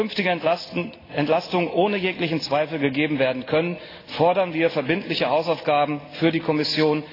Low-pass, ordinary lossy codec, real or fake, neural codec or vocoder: 5.4 kHz; AAC, 24 kbps; real; none